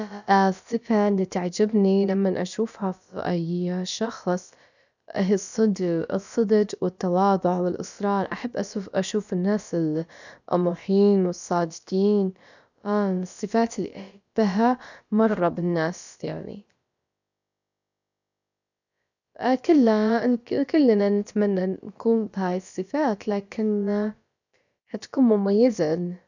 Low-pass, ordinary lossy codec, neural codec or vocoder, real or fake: 7.2 kHz; none; codec, 16 kHz, about 1 kbps, DyCAST, with the encoder's durations; fake